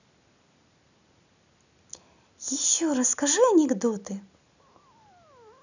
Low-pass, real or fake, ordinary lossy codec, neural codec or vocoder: 7.2 kHz; real; none; none